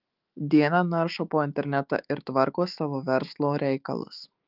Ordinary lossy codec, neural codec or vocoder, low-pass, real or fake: Opus, 32 kbps; autoencoder, 48 kHz, 128 numbers a frame, DAC-VAE, trained on Japanese speech; 5.4 kHz; fake